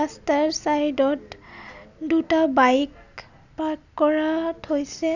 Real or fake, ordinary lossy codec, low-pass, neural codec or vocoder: real; none; 7.2 kHz; none